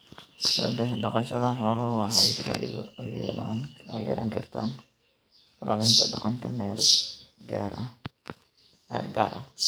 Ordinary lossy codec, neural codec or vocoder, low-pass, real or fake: none; codec, 44.1 kHz, 2.6 kbps, SNAC; none; fake